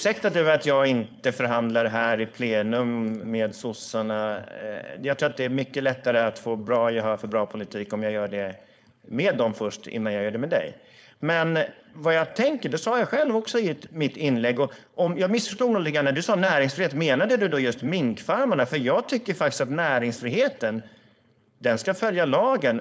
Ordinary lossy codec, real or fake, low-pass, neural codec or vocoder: none; fake; none; codec, 16 kHz, 4.8 kbps, FACodec